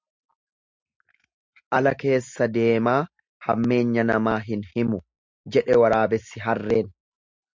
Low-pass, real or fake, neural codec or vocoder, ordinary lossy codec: 7.2 kHz; real; none; MP3, 64 kbps